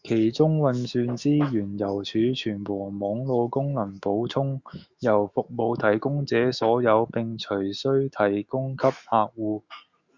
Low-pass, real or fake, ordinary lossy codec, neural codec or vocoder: 7.2 kHz; fake; Opus, 64 kbps; autoencoder, 48 kHz, 128 numbers a frame, DAC-VAE, trained on Japanese speech